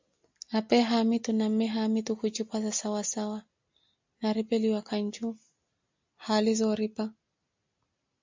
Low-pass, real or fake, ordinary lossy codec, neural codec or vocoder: 7.2 kHz; real; MP3, 64 kbps; none